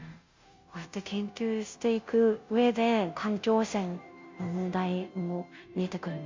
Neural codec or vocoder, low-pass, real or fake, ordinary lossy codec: codec, 16 kHz, 0.5 kbps, FunCodec, trained on Chinese and English, 25 frames a second; 7.2 kHz; fake; MP3, 64 kbps